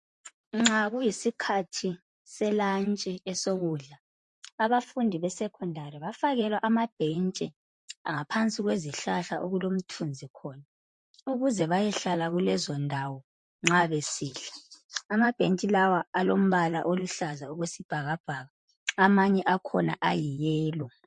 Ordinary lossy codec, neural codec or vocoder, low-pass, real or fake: MP3, 48 kbps; vocoder, 44.1 kHz, 128 mel bands, Pupu-Vocoder; 10.8 kHz; fake